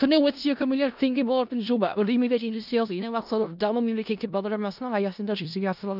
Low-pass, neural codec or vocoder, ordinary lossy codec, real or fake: 5.4 kHz; codec, 16 kHz in and 24 kHz out, 0.4 kbps, LongCat-Audio-Codec, four codebook decoder; none; fake